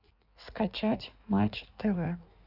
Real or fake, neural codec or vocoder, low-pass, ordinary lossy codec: fake; codec, 16 kHz in and 24 kHz out, 1.1 kbps, FireRedTTS-2 codec; 5.4 kHz; none